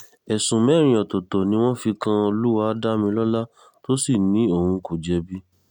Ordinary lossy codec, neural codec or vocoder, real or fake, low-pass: none; none; real; 19.8 kHz